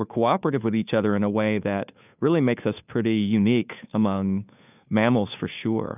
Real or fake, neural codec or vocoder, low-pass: fake; codec, 16 kHz, 2 kbps, FunCodec, trained on Chinese and English, 25 frames a second; 3.6 kHz